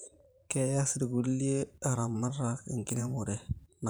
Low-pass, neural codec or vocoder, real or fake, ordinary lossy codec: none; vocoder, 44.1 kHz, 128 mel bands every 512 samples, BigVGAN v2; fake; none